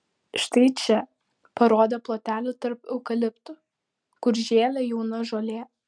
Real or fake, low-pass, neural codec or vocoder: real; 9.9 kHz; none